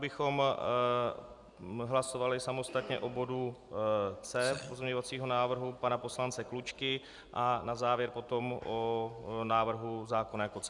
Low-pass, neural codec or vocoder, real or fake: 10.8 kHz; none; real